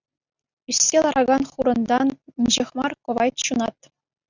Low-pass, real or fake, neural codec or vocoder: 7.2 kHz; real; none